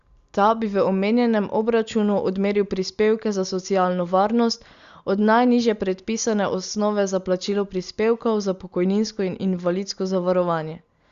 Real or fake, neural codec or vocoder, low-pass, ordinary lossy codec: real; none; 7.2 kHz; Opus, 64 kbps